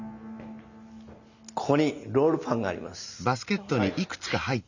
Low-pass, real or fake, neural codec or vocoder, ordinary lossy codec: 7.2 kHz; real; none; none